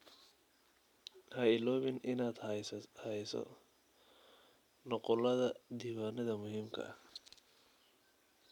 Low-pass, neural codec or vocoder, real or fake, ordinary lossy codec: 19.8 kHz; none; real; none